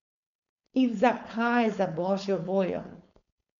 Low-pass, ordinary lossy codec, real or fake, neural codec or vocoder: 7.2 kHz; none; fake; codec, 16 kHz, 4.8 kbps, FACodec